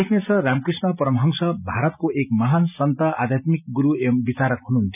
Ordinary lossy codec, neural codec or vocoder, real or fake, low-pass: none; none; real; 3.6 kHz